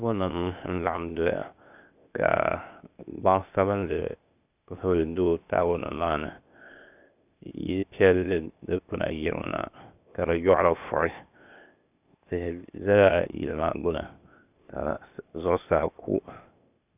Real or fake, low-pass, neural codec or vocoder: fake; 3.6 kHz; codec, 16 kHz, 0.8 kbps, ZipCodec